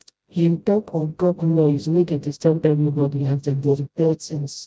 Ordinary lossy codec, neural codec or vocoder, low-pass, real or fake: none; codec, 16 kHz, 0.5 kbps, FreqCodec, smaller model; none; fake